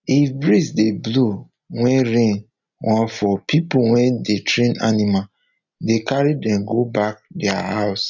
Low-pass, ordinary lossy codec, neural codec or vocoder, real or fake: 7.2 kHz; none; none; real